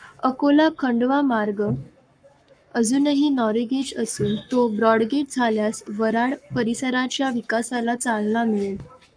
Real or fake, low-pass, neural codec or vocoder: fake; 9.9 kHz; codec, 44.1 kHz, 7.8 kbps, Pupu-Codec